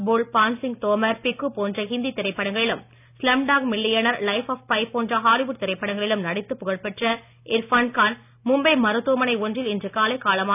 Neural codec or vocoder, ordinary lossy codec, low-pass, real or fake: none; none; 3.6 kHz; real